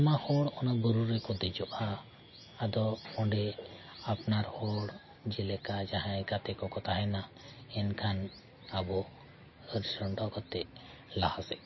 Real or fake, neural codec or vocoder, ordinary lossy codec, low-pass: real; none; MP3, 24 kbps; 7.2 kHz